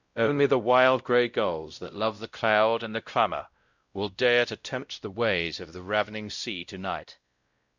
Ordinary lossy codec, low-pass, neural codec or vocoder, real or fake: Opus, 64 kbps; 7.2 kHz; codec, 16 kHz, 0.5 kbps, X-Codec, WavLM features, trained on Multilingual LibriSpeech; fake